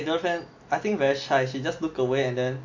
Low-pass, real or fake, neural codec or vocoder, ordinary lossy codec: 7.2 kHz; real; none; AAC, 48 kbps